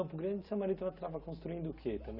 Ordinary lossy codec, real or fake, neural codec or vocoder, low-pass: none; real; none; 5.4 kHz